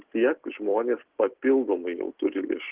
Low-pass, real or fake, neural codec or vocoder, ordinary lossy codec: 3.6 kHz; real; none; Opus, 16 kbps